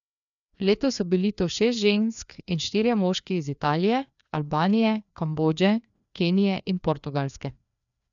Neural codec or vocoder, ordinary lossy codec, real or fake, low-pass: codec, 16 kHz, 2 kbps, FreqCodec, larger model; none; fake; 7.2 kHz